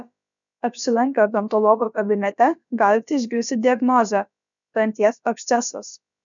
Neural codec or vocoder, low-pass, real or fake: codec, 16 kHz, about 1 kbps, DyCAST, with the encoder's durations; 7.2 kHz; fake